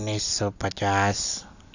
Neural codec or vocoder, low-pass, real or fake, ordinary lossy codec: none; 7.2 kHz; real; none